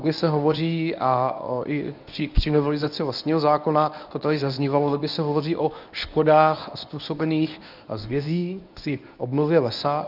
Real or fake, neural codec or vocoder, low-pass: fake; codec, 24 kHz, 0.9 kbps, WavTokenizer, medium speech release version 1; 5.4 kHz